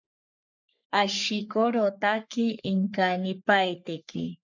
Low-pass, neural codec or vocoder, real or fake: 7.2 kHz; codec, 44.1 kHz, 3.4 kbps, Pupu-Codec; fake